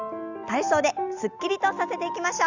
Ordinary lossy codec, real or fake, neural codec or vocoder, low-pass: none; real; none; 7.2 kHz